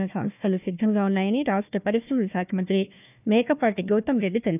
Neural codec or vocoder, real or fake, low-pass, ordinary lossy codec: codec, 16 kHz, 1 kbps, FunCodec, trained on Chinese and English, 50 frames a second; fake; 3.6 kHz; none